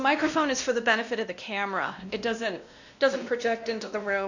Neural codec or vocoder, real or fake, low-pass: codec, 16 kHz, 1 kbps, X-Codec, WavLM features, trained on Multilingual LibriSpeech; fake; 7.2 kHz